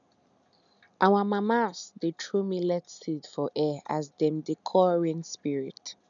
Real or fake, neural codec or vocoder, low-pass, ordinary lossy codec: real; none; 7.2 kHz; none